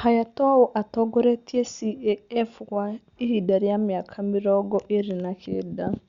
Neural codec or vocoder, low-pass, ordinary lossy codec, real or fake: none; 7.2 kHz; Opus, 64 kbps; real